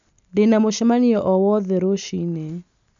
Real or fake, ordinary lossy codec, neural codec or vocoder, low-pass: real; none; none; 7.2 kHz